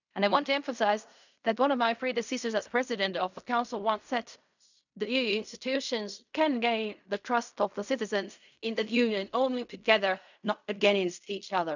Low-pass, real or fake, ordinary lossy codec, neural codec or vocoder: 7.2 kHz; fake; none; codec, 16 kHz in and 24 kHz out, 0.4 kbps, LongCat-Audio-Codec, fine tuned four codebook decoder